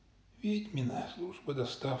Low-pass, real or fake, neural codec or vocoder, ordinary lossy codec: none; real; none; none